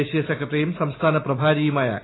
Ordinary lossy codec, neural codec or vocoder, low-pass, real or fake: AAC, 16 kbps; none; 7.2 kHz; real